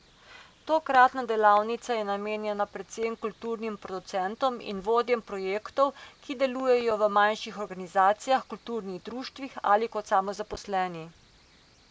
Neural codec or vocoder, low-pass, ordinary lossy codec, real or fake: none; none; none; real